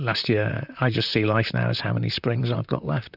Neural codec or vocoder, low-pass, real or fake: vocoder, 44.1 kHz, 128 mel bands, Pupu-Vocoder; 5.4 kHz; fake